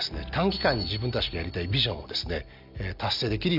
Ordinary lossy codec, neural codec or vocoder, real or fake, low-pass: none; none; real; 5.4 kHz